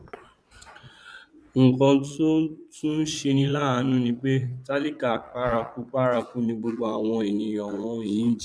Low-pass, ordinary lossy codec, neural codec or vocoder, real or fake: none; none; vocoder, 22.05 kHz, 80 mel bands, Vocos; fake